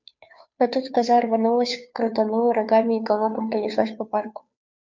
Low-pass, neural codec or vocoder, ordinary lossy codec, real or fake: 7.2 kHz; codec, 16 kHz, 2 kbps, FunCodec, trained on Chinese and English, 25 frames a second; MP3, 64 kbps; fake